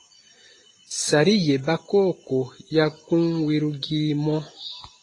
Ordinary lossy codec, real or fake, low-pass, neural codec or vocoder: AAC, 32 kbps; real; 10.8 kHz; none